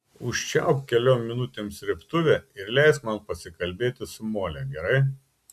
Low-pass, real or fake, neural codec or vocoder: 14.4 kHz; real; none